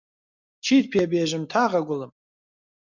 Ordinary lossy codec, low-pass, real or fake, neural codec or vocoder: MP3, 64 kbps; 7.2 kHz; real; none